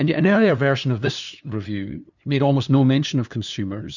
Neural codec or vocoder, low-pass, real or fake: codec, 16 kHz, 2 kbps, FunCodec, trained on LibriTTS, 25 frames a second; 7.2 kHz; fake